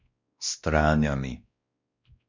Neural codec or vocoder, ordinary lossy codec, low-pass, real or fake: codec, 16 kHz, 1 kbps, X-Codec, WavLM features, trained on Multilingual LibriSpeech; MP3, 64 kbps; 7.2 kHz; fake